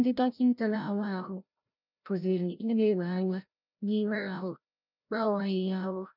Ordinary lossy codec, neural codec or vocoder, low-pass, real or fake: none; codec, 16 kHz, 0.5 kbps, FreqCodec, larger model; 5.4 kHz; fake